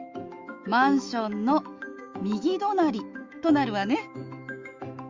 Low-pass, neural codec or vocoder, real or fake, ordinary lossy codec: 7.2 kHz; autoencoder, 48 kHz, 128 numbers a frame, DAC-VAE, trained on Japanese speech; fake; Opus, 32 kbps